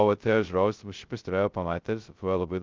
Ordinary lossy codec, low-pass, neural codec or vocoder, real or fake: Opus, 32 kbps; 7.2 kHz; codec, 16 kHz, 0.2 kbps, FocalCodec; fake